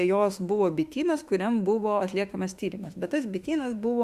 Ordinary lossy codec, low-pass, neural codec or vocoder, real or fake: Opus, 64 kbps; 14.4 kHz; autoencoder, 48 kHz, 32 numbers a frame, DAC-VAE, trained on Japanese speech; fake